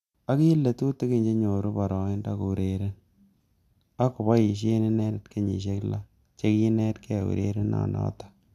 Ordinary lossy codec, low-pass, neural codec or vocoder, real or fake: none; 14.4 kHz; none; real